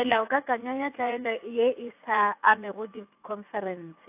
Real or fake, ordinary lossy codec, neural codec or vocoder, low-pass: fake; AAC, 32 kbps; vocoder, 44.1 kHz, 80 mel bands, Vocos; 3.6 kHz